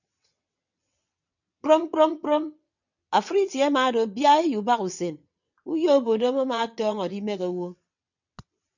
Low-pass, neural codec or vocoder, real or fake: 7.2 kHz; vocoder, 22.05 kHz, 80 mel bands, WaveNeXt; fake